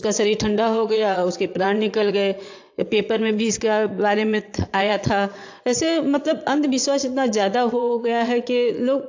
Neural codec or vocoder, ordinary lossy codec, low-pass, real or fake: vocoder, 22.05 kHz, 80 mel bands, Vocos; AAC, 48 kbps; 7.2 kHz; fake